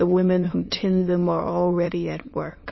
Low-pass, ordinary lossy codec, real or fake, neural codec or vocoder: 7.2 kHz; MP3, 24 kbps; fake; autoencoder, 22.05 kHz, a latent of 192 numbers a frame, VITS, trained on many speakers